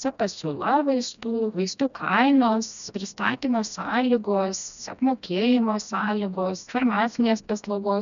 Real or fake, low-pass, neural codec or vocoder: fake; 7.2 kHz; codec, 16 kHz, 1 kbps, FreqCodec, smaller model